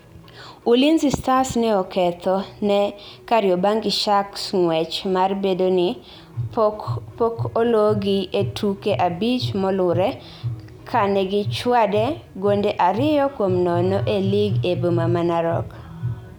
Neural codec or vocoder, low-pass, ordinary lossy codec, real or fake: none; none; none; real